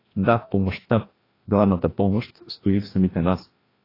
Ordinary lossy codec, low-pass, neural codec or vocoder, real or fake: AAC, 24 kbps; 5.4 kHz; codec, 16 kHz, 1 kbps, FreqCodec, larger model; fake